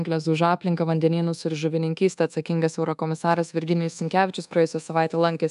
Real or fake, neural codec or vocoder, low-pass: fake; codec, 24 kHz, 1.2 kbps, DualCodec; 10.8 kHz